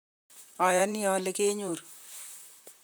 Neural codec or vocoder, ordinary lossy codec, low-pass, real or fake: vocoder, 44.1 kHz, 128 mel bands, Pupu-Vocoder; none; none; fake